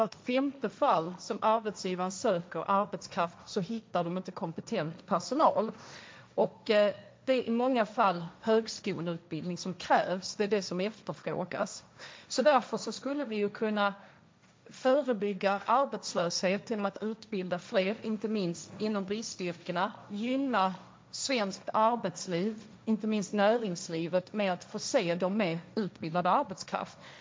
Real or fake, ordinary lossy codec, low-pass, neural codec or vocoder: fake; none; none; codec, 16 kHz, 1.1 kbps, Voila-Tokenizer